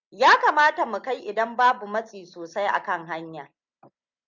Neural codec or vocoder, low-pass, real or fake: none; 7.2 kHz; real